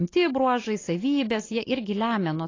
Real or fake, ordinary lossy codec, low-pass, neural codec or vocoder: real; AAC, 32 kbps; 7.2 kHz; none